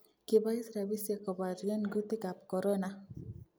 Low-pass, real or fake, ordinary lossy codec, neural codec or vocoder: none; real; none; none